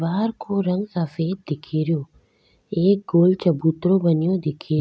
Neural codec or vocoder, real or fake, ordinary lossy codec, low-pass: none; real; none; none